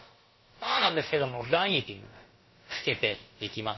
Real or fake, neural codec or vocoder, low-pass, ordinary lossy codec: fake; codec, 16 kHz, about 1 kbps, DyCAST, with the encoder's durations; 7.2 kHz; MP3, 24 kbps